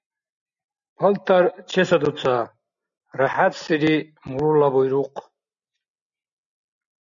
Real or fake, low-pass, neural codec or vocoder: real; 7.2 kHz; none